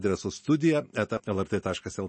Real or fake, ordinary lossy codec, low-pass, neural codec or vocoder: real; MP3, 32 kbps; 9.9 kHz; none